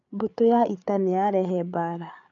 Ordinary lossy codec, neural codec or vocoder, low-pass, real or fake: AAC, 64 kbps; codec, 16 kHz, 8 kbps, FreqCodec, larger model; 7.2 kHz; fake